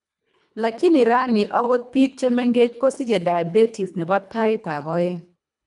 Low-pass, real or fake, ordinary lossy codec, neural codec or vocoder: 10.8 kHz; fake; none; codec, 24 kHz, 1.5 kbps, HILCodec